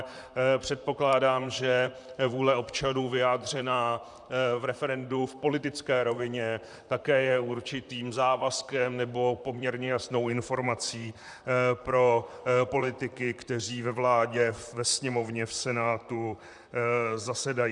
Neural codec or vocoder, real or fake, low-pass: vocoder, 44.1 kHz, 128 mel bands, Pupu-Vocoder; fake; 10.8 kHz